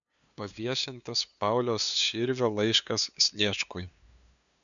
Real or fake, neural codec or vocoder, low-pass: fake; codec, 16 kHz, 2 kbps, FunCodec, trained on LibriTTS, 25 frames a second; 7.2 kHz